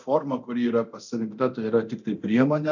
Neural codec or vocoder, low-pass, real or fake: codec, 24 kHz, 0.9 kbps, DualCodec; 7.2 kHz; fake